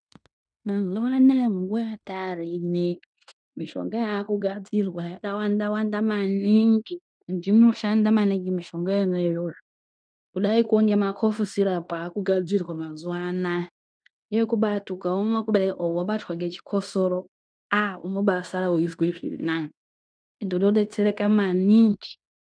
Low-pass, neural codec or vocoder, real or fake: 9.9 kHz; codec, 16 kHz in and 24 kHz out, 0.9 kbps, LongCat-Audio-Codec, fine tuned four codebook decoder; fake